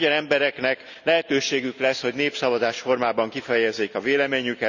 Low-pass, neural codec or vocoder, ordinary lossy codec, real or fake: 7.2 kHz; none; none; real